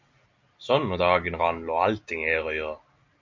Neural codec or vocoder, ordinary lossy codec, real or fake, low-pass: none; MP3, 48 kbps; real; 7.2 kHz